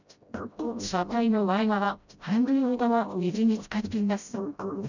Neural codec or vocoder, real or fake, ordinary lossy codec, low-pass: codec, 16 kHz, 0.5 kbps, FreqCodec, smaller model; fake; none; 7.2 kHz